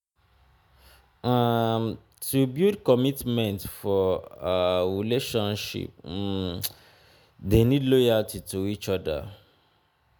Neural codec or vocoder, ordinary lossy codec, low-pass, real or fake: none; none; none; real